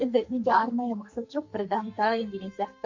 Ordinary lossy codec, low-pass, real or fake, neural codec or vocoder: MP3, 48 kbps; 7.2 kHz; fake; codec, 44.1 kHz, 2.6 kbps, SNAC